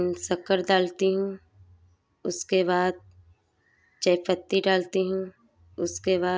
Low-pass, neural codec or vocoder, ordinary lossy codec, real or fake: none; none; none; real